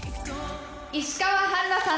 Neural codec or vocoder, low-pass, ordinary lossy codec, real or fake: none; none; none; real